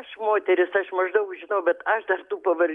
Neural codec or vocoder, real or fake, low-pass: none; real; 10.8 kHz